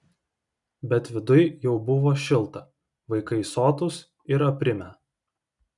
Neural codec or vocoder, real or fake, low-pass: none; real; 10.8 kHz